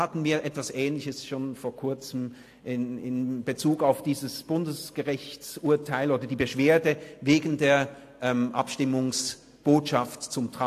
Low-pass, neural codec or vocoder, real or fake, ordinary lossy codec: 14.4 kHz; none; real; AAC, 64 kbps